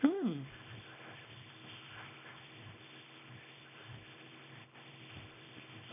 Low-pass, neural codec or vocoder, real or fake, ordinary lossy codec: 3.6 kHz; codec, 24 kHz, 0.9 kbps, WavTokenizer, small release; fake; none